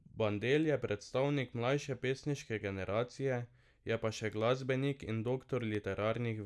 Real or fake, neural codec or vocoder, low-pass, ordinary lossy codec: real; none; none; none